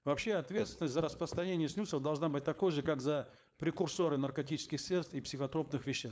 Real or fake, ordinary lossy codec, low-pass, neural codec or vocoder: fake; none; none; codec, 16 kHz, 4.8 kbps, FACodec